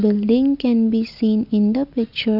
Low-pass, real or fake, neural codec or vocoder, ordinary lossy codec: 5.4 kHz; real; none; none